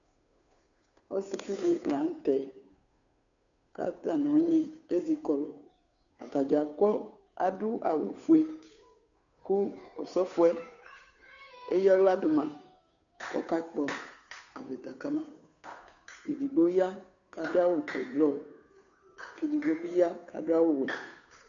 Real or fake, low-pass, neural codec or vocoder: fake; 7.2 kHz; codec, 16 kHz, 2 kbps, FunCodec, trained on Chinese and English, 25 frames a second